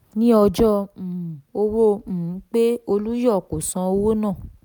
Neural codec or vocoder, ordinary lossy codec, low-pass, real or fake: none; none; none; real